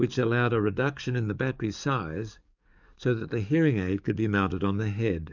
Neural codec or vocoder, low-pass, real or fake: codec, 44.1 kHz, 7.8 kbps, DAC; 7.2 kHz; fake